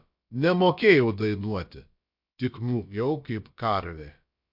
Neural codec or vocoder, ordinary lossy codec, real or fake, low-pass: codec, 16 kHz, about 1 kbps, DyCAST, with the encoder's durations; MP3, 48 kbps; fake; 5.4 kHz